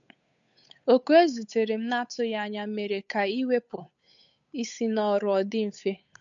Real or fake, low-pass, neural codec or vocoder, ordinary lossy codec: fake; 7.2 kHz; codec, 16 kHz, 8 kbps, FunCodec, trained on Chinese and English, 25 frames a second; none